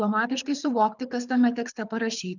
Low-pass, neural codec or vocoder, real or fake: 7.2 kHz; codec, 24 kHz, 6 kbps, HILCodec; fake